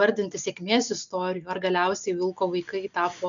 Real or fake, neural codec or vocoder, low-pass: real; none; 7.2 kHz